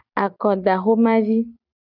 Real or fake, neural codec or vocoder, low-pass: real; none; 5.4 kHz